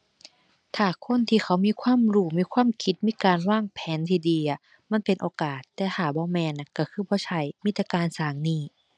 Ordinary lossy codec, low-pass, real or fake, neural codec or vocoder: none; 9.9 kHz; real; none